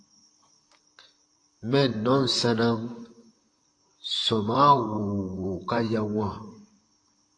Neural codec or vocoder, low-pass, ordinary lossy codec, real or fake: vocoder, 44.1 kHz, 128 mel bands, Pupu-Vocoder; 9.9 kHz; AAC, 48 kbps; fake